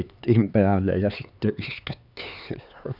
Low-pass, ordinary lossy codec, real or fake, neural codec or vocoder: 5.4 kHz; none; fake; codec, 16 kHz, 4 kbps, X-Codec, HuBERT features, trained on LibriSpeech